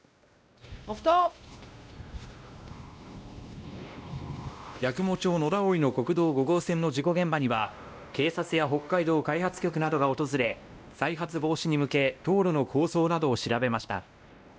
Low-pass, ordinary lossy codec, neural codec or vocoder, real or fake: none; none; codec, 16 kHz, 1 kbps, X-Codec, WavLM features, trained on Multilingual LibriSpeech; fake